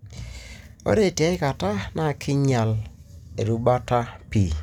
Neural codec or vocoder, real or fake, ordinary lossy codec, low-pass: none; real; none; 19.8 kHz